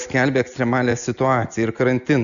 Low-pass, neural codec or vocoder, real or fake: 7.2 kHz; none; real